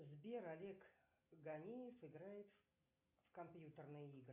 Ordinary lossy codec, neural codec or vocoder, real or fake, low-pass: MP3, 32 kbps; none; real; 3.6 kHz